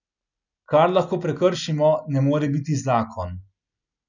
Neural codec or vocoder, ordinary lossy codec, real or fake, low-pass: none; none; real; 7.2 kHz